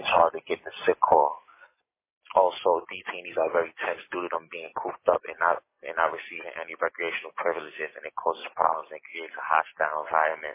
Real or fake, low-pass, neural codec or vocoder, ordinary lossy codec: real; 3.6 kHz; none; MP3, 32 kbps